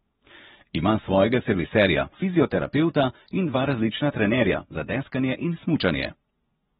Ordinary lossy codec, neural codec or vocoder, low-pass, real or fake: AAC, 16 kbps; vocoder, 24 kHz, 100 mel bands, Vocos; 10.8 kHz; fake